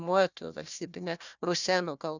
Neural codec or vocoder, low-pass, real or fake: codec, 16 kHz, 1 kbps, FunCodec, trained on Chinese and English, 50 frames a second; 7.2 kHz; fake